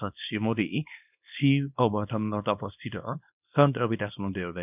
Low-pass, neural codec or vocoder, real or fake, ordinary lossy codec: 3.6 kHz; codec, 24 kHz, 0.9 kbps, WavTokenizer, small release; fake; none